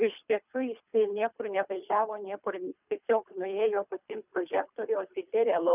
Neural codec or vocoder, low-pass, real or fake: codec, 24 kHz, 3 kbps, HILCodec; 3.6 kHz; fake